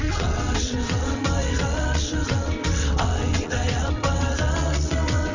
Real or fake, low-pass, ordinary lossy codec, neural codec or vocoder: fake; 7.2 kHz; none; vocoder, 22.05 kHz, 80 mel bands, WaveNeXt